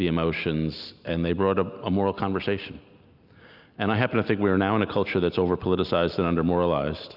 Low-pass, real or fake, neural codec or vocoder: 5.4 kHz; real; none